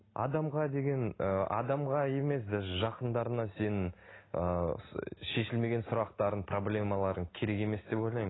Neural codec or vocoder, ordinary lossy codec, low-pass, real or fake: none; AAC, 16 kbps; 7.2 kHz; real